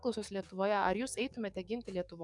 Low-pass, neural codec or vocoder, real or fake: 10.8 kHz; codec, 44.1 kHz, 7.8 kbps, DAC; fake